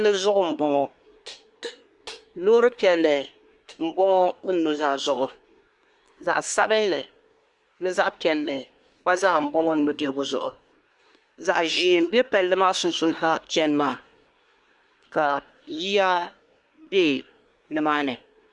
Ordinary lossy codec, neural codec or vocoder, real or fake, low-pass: Opus, 64 kbps; codec, 24 kHz, 1 kbps, SNAC; fake; 10.8 kHz